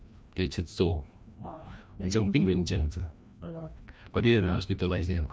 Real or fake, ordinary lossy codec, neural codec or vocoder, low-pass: fake; none; codec, 16 kHz, 1 kbps, FreqCodec, larger model; none